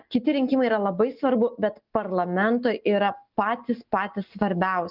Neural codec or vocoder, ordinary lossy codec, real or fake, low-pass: none; Opus, 24 kbps; real; 5.4 kHz